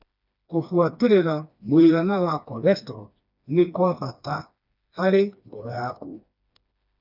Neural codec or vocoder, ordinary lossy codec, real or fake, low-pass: codec, 16 kHz, 2 kbps, FreqCodec, smaller model; none; fake; 5.4 kHz